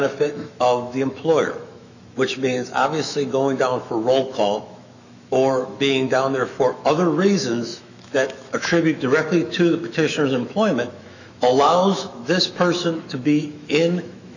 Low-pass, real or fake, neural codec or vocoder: 7.2 kHz; fake; autoencoder, 48 kHz, 128 numbers a frame, DAC-VAE, trained on Japanese speech